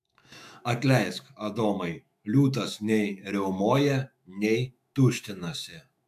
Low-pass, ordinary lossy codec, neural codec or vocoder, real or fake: 14.4 kHz; MP3, 96 kbps; autoencoder, 48 kHz, 128 numbers a frame, DAC-VAE, trained on Japanese speech; fake